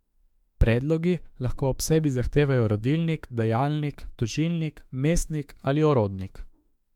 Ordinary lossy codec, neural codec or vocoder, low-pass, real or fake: MP3, 96 kbps; autoencoder, 48 kHz, 32 numbers a frame, DAC-VAE, trained on Japanese speech; 19.8 kHz; fake